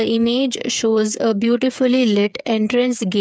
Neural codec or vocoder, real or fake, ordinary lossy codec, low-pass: codec, 16 kHz, 8 kbps, FreqCodec, smaller model; fake; none; none